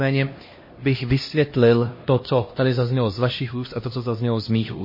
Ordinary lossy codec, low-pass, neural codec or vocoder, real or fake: MP3, 24 kbps; 5.4 kHz; codec, 16 kHz, 1 kbps, X-Codec, HuBERT features, trained on LibriSpeech; fake